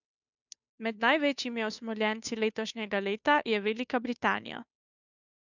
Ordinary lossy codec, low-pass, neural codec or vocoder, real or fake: none; 7.2 kHz; codec, 16 kHz, 2 kbps, FunCodec, trained on Chinese and English, 25 frames a second; fake